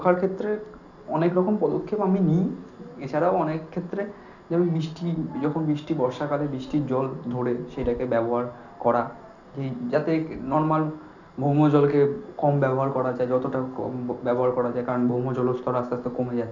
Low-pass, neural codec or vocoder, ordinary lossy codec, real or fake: 7.2 kHz; none; none; real